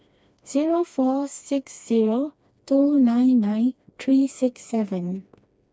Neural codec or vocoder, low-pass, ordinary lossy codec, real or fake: codec, 16 kHz, 2 kbps, FreqCodec, smaller model; none; none; fake